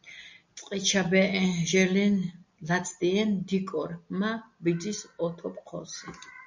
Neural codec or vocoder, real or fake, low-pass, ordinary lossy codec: none; real; 7.2 kHz; MP3, 64 kbps